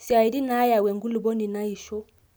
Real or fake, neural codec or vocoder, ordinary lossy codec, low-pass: real; none; none; none